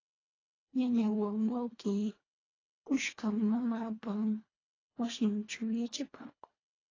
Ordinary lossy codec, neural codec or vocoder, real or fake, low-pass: AAC, 32 kbps; codec, 24 kHz, 1.5 kbps, HILCodec; fake; 7.2 kHz